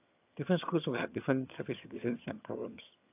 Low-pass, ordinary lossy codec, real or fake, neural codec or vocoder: 3.6 kHz; AAC, 32 kbps; fake; vocoder, 22.05 kHz, 80 mel bands, HiFi-GAN